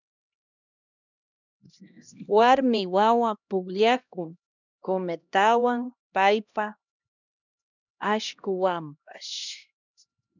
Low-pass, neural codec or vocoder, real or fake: 7.2 kHz; codec, 16 kHz, 1 kbps, X-Codec, HuBERT features, trained on LibriSpeech; fake